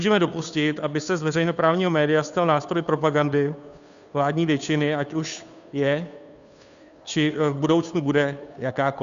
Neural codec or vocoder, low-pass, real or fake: codec, 16 kHz, 2 kbps, FunCodec, trained on Chinese and English, 25 frames a second; 7.2 kHz; fake